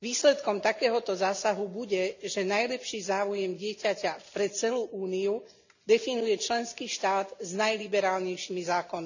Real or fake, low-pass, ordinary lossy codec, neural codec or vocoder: real; 7.2 kHz; none; none